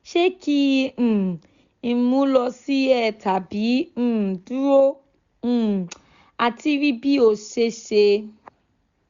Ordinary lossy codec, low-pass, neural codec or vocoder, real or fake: Opus, 64 kbps; 7.2 kHz; none; real